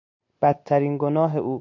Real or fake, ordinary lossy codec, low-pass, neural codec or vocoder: real; MP3, 48 kbps; 7.2 kHz; none